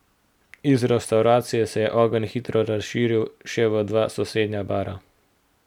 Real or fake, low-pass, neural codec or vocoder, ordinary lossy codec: real; 19.8 kHz; none; none